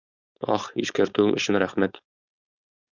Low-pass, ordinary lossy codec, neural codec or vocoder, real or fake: 7.2 kHz; Opus, 64 kbps; codec, 16 kHz, 4.8 kbps, FACodec; fake